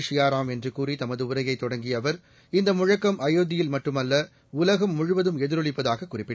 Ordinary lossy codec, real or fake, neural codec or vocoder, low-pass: none; real; none; none